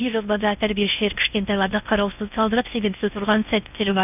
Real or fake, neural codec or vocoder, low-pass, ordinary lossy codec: fake; codec, 16 kHz in and 24 kHz out, 0.6 kbps, FocalCodec, streaming, 2048 codes; 3.6 kHz; none